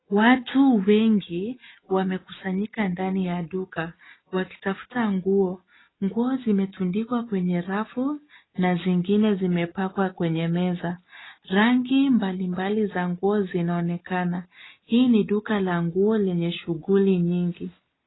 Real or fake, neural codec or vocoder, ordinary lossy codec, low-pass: real; none; AAC, 16 kbps; 7.2 kHz